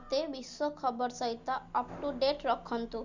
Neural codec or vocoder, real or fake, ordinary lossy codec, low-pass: none; real; Opus, 64 kbps; 7.2 kHz